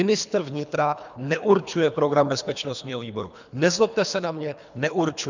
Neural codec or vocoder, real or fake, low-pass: codec, 24 kHz, 3 kbps, HILCodec; fake; 7.2 kHz